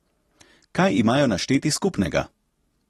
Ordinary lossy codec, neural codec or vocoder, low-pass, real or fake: AAC, 32 kbps; none; 19.8 kHz; real